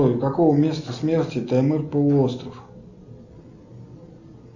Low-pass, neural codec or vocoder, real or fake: 7.2 kHz; none; real